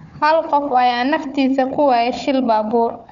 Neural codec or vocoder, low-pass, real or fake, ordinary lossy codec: codec, 16 kHz, 4 kbps, FunCodec, trained on Chinese and English, 50 frames a second; 7.2 kHz; fake; none